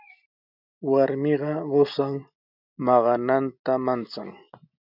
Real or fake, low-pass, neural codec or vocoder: real; 5.4 kHz; none